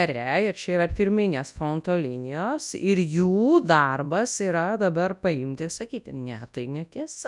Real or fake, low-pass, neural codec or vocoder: fake; 10.8 kHz; codec, 24 kHz, 0.9 kbps, WavTokenizer, large speech release